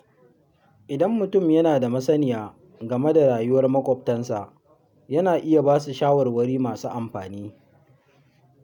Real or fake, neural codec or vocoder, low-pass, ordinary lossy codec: real; none; 19.8 kHz; none